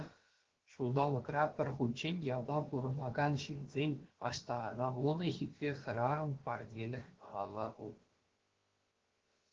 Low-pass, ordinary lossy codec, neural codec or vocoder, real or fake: 7.2 kHz; Opus, 16 kbps; codec, 16 kHz, about 1 kbps, DyCAST, with the encoder's durations; fake